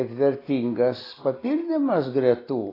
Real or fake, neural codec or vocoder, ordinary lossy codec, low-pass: real; none; AAC, 24 kbps; 5.4 kHz